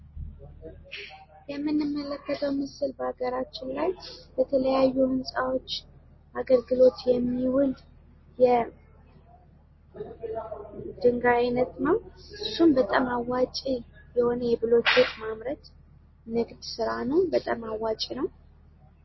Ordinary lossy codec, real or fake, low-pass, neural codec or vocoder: MP3, 24 kbps; real; 7.2 kHz; none